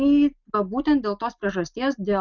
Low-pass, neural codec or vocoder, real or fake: 7.2 kHz; none; real